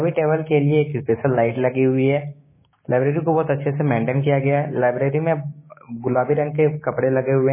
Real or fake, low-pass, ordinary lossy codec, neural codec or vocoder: fake; 3.6 kHz; MP3, 16 kbps; codec, 16 kHz, 6 kbps, DAC